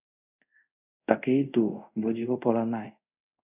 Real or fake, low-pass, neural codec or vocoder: fake; 3.6 kHz; codec, 24 kHz, 0.5 kbps, DualCodec